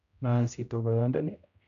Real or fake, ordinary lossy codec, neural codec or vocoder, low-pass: fake; none; codec, 16 kHz, 0.5 kbps, X-Codec, HuBERT features, trained on general audio; 7.2 kHz